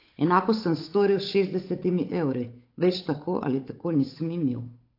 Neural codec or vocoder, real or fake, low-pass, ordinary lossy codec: codec, 16 kHz, 8 kbps, FunCodec, trained on Chinese and English, 25 frames a second; fake; 5.4 kHz; AAC, 32 kbps